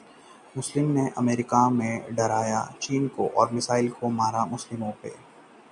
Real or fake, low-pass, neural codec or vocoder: real; 10.8 kHz; none